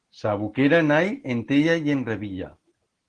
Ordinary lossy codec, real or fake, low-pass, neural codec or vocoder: Opus, 16 kbps; real; 9.9 kHz; none